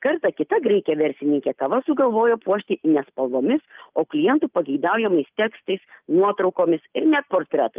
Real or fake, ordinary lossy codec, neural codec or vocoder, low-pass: real; Opus, 24 kbps; none; 3.6 kHz